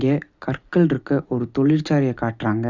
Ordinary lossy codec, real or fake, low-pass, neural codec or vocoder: Opus, 64 kbps; real; 7.2 kHz; none